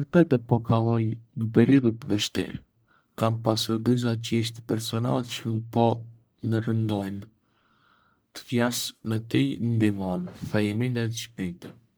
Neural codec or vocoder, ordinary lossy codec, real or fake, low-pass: codec, 44.1 kHz, 1.7 kbps, Pupu-Codec; none; fake; none